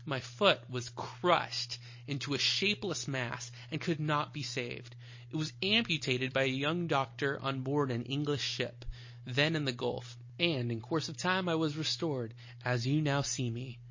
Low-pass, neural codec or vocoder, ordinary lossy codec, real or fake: 7.2 kHz; none; MP3, 32 kbps; real